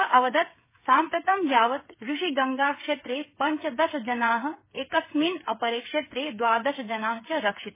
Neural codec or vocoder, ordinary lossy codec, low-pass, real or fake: codec, 16 kHz, 8 kbps, FreqCodec, smaller model; MP3, 16 kbps; 3.6 kHz; fake